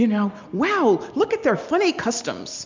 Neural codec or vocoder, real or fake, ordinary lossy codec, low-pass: none; real; MP3, 64 kbps; 7.2 kHz